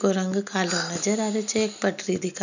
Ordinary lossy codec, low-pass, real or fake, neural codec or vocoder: none; 7.2 kHz; real; none